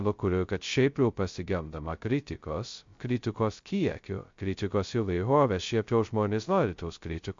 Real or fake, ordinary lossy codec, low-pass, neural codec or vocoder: fake; MP3, 48 kbps; 7.2 kHz; codec, 16 kHz, 0.2 kbps, FocalCodec